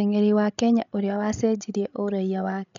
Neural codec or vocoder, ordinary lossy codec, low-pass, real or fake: codec, 16 kHz, 16 kbps, FreqCodec, larger model; none; 7.2 kHz; fake